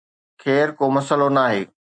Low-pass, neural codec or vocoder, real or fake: 9.9 kHz; none; real